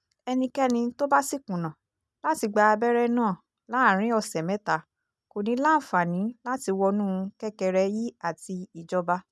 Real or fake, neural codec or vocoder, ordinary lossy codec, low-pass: real; none; none; none